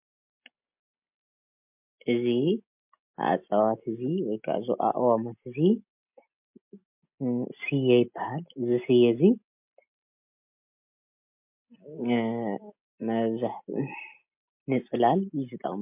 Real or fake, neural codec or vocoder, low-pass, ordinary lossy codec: real; none; 3.6 kHz; MP3, 32 kbps